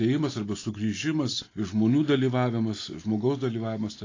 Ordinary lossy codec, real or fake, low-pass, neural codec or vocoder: AAC, 32 kbps; real; 7.2 kHz; none